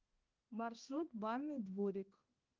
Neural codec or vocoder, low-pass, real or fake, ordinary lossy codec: codec, 16 kHz, 1 kbps, X-Codec, HuBERT features, trained on balanced general audio; 7.2 kHz; fake; Opus, 32 kbps